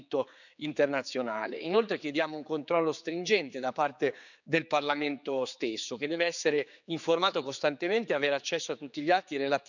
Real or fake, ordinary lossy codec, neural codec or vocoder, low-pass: fake; none; codec, 16 kHz, 4 kbps, X-Codec, HuBERT features, trained on general audio; 7.2 kHz